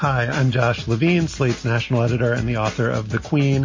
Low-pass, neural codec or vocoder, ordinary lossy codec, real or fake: 7.2 kHz; none; MP3, 32 kbps; real